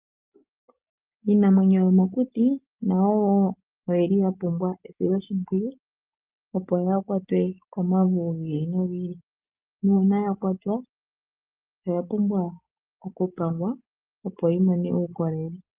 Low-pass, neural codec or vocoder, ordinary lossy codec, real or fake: 3.6 kHz; none; Opus, 32 kbps; real